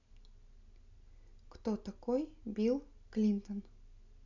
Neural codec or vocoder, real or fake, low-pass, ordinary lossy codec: none; real; 7.2 kHz; none